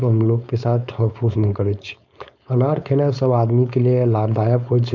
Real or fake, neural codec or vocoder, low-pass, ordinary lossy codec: fake; codec, 16 kHz, 4.8 kbps, FACodec; 7.2 kHz; none